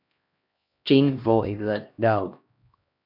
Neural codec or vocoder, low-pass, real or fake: codec, 16 kHz, 1 kbps, X-Codec, HuBERT features, trained on LibriSpeech; 5.4 kHz; fake